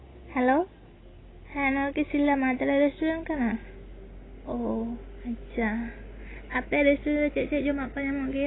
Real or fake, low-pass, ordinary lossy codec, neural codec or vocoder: real; 7.2 kHz; AAC, 16 kbps; none